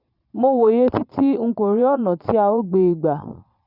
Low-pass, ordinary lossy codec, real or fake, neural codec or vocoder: 5.4 kHz; none; fake; vocoder, 22.05 kHz, 80 mel bands, Vocos